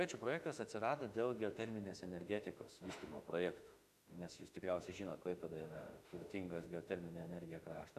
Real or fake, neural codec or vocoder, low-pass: fake; autoencoder, 48 kHz, 32 numbers a frame, DAC-VAE, trained on Japanese speech; 14.4 kHz